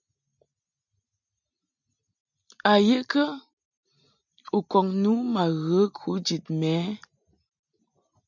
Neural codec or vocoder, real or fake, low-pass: none; real; 7.2 kHz